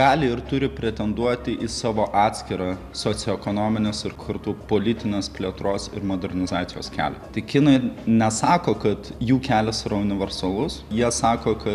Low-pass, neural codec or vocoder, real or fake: 14.4 kHz; none; real